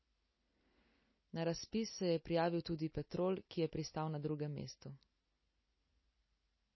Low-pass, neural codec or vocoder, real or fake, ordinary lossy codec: 7.2 kHz; none; real; MP3, 24 kbps